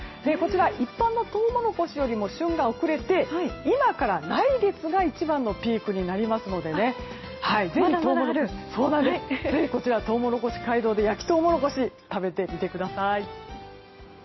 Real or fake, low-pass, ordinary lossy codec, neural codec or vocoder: real; 7.2 kHz; MP3, 24 kbps; none